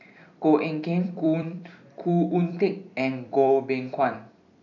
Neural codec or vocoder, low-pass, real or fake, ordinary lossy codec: vocoder, 44.1 kHz, 128 mel bands every 256 samples, BigVGAN v2; 7.2 kHz; fake; none